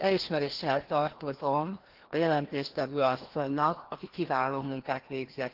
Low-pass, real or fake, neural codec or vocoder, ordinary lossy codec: 5.4 kHz; fake; codec, 16 kHz, 1 kbps, FreqCodec, larger model; Opus, 16 kbps